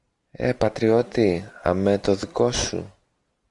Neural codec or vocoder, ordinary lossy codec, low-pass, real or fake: none; MP3, 96 kbps; 10.8 kHz; real